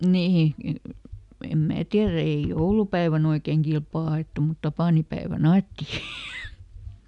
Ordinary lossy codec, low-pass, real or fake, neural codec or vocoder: none; 10.8 kHz; real; none